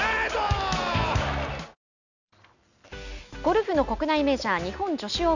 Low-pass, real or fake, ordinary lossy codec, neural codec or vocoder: 7.2 kHz; real; none; none